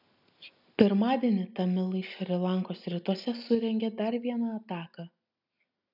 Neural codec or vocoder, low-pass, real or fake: none; 5.4 kHz; real